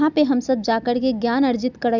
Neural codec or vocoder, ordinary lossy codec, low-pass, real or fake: none; none; 7.2 kHz; real